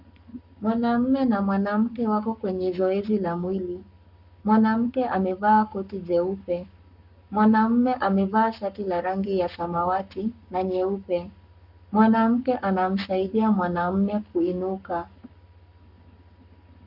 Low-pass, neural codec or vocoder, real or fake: 5.4 kHz; codec, 44.1 kHz, 7.8 kbps, Pupu-Codec; fake